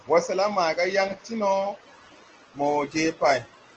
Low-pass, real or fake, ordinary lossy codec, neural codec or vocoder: 7.2 kHz; real; Opus, 16 kbps; none